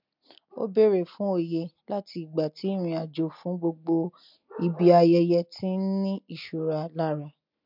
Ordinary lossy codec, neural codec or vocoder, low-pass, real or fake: MP3, 48 kbps; none; 5.4 kHz; real